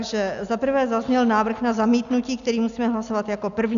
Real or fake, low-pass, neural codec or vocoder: real; 7.2 kHz; none